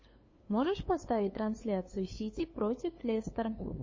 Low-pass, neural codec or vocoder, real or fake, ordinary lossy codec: 7.2 kHz; codec, 16 kHz, 2 kbps, FunCodec, trained on LibriTTS, 25 frames a second; fake; MP3, 32 kbps